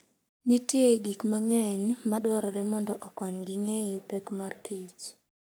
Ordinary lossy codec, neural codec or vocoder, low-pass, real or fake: none; codec, 44.1 kHz, 3.4 kbps, Pupu-Codec; none; fake